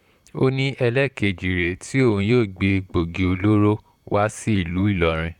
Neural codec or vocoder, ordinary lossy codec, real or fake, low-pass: vocoder, 44.1 kHz, 128 mel bands, Pupu-Vocoder; none; fake; 19.8 kHz